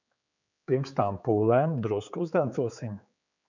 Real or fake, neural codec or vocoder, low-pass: fake; codec, 16 kHz, 4 kbps, X-Codec, HuBERT features, trained on general audio; 7.2 kHz